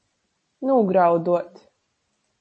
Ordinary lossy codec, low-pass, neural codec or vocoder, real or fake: MP3, 32 kbps; 9.9 kHz; none; real